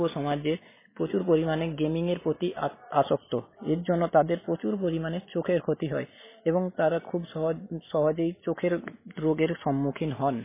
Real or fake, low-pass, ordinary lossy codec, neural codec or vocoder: real; 3.6 kHz; MP3, 16 kbps; none